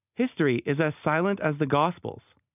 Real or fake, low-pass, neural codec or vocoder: real; 3.6 kHz; none